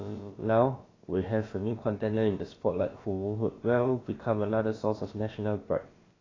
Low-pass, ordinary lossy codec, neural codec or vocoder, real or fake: 7.2 kHz; AAC, 32 kbps; codec, 16 kHz, about 1 kbps, DyCAST, with the encoder's durations; fake